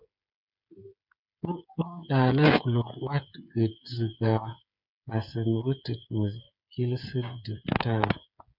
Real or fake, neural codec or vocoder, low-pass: fake; codec, 16 kHz, 8 kbps, FreqCodec, smaller model; 5.4 kHz